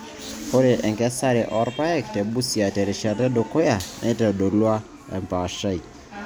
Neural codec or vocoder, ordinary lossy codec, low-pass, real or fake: none; none; none; real